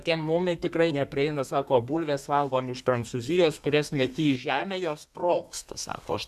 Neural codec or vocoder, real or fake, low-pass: codec, 32 kHz, 1.9 kbps, SNAC; fake; 14.4 kHz